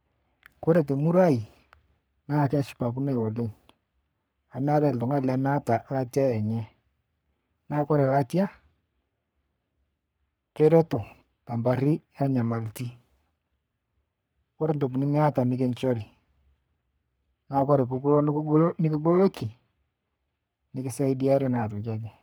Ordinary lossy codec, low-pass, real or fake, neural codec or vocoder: none; none; fake; codec, 44.1 kHz, 3.4 kbps, Pupu-Codec